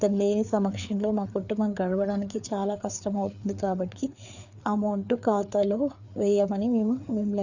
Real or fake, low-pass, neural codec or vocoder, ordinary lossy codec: fake; 7.2 kHz; codec, 16 kHz, 4 kbps, FreqCodec, larger model; none